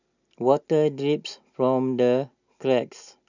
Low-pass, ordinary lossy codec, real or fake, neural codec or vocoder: 7.2 kHz; none; real; none